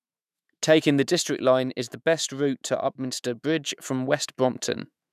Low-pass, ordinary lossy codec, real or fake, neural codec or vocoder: 14.4 kHz; none; fake; autoencoder, 48 kHz, 128 numbers a frame, DAC-VAE, trained on Japanese speech